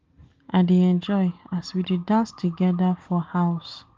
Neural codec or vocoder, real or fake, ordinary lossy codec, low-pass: none; real; Opus, 32 kbps; 7.2 kHz